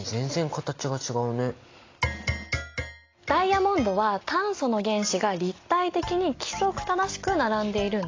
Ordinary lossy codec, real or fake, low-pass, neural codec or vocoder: AAC, 32 kbps; real; 7.2 kHz; none